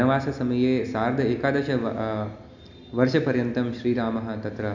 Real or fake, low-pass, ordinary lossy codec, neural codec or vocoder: real; 7.2 kHz; none; none